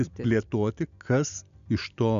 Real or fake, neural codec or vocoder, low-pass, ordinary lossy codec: real; none; 7.2 kHz; MP3, 96 kbps